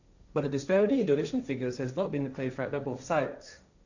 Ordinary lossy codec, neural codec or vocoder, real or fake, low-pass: none; codec, 16 kHz, 1.1 kbps, Voila-Tokenizer; fake; 7.2 kHz